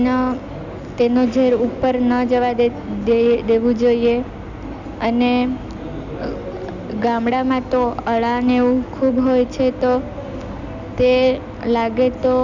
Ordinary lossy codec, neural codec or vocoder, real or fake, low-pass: none; none; real; 7.2 kHz